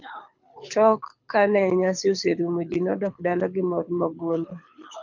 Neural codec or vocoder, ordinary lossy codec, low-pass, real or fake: codec, 24 kHz, 6 kbps, HILCodec; MP3, 64 kbps; 7.2 kHz; fake